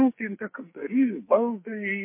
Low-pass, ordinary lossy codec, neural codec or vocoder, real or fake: 3.6 kHz; MP3, 24 kbps; codec, 44.1 kHz, 2.6 kbps, SNAC; fake